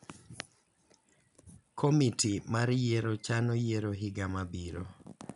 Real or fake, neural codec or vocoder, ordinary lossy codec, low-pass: real; none; none; 10.8 kHz